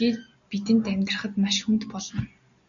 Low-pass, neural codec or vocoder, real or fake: 7.2 kHz; none; real